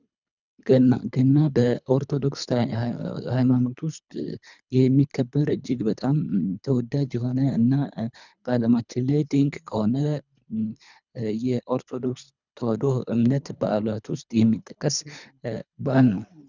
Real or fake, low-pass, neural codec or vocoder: fake; 7.2 kHz; codec, 24 kHz, 3 kbps, HILCodec